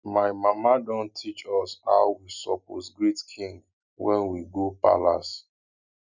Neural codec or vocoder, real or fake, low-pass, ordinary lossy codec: none; real; 7.2 kHz; none